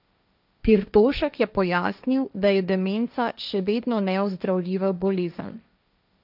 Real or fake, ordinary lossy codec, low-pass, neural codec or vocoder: fake; none; 5.4 kHz; codec, 16 kHz, 1.1 kbps, Voila-Tokenizer